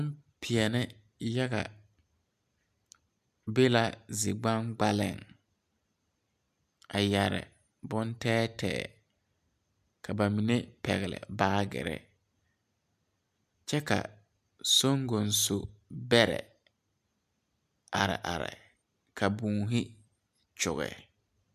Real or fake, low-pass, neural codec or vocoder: real; 14.4 kHz; none